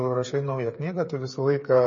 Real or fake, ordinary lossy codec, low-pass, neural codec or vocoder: fake; MP3, 32 kbps; 7.2 kHz; codec, 16 kHz, 8 kbps, FreqCodec, smaller model